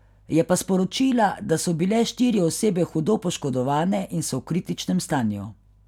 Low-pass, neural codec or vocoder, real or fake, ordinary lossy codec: 19.8 kHz; none; real; none